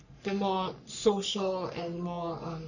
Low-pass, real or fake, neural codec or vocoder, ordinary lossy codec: 7.2 kHz; fake; codec, 44.1 kHz, 3.4 kbps, Pupu-Codec; none